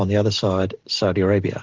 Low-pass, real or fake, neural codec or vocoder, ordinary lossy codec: 7.2 kHz; real; none; Opus, 16 kbps